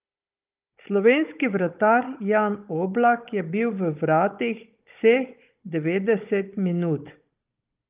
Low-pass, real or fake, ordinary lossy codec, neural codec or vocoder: 3.6 kHz; fake; Opus, 24 kbps; codec, 16 kHz, 16 kbps, FunCodec, trained on Chinese and English, 50 frames a second